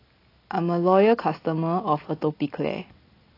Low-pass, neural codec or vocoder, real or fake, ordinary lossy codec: 5.4 kHz; none; real; AAC, 24 kbps